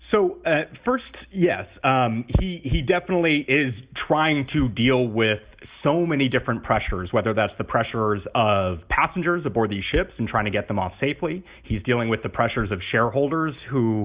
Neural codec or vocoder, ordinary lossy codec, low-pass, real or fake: none; Opus, 64 kbps; 3.6 kHz; real